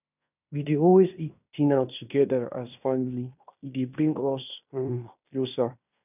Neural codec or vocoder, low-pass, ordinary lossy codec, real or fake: codec, 16 kHz in and 24 kHz out, 0.9 kbps, LongCat-Audio-Codec, fine tuned four codebook decoder; 3.6 kHz; none; fake